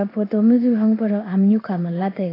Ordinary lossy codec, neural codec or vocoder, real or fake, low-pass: AAC, 24 kbps; codec, 16 kHz in and 24 kHz out, 1 kbps, XY-Tokenizer; fake; 5.4 kHz